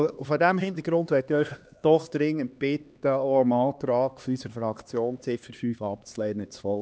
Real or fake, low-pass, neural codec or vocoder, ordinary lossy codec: fake; none; codec, 16 kHz, 2 kbps, X-Codec, HuBERT features, trained on LibriSpeech; none